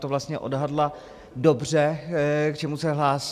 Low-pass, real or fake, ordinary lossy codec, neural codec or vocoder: 14.4 kHz; real; AAC, 96 kbps; none